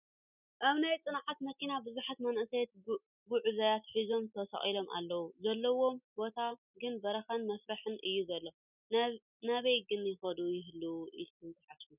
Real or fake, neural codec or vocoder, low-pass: real; none; 3.6 kHz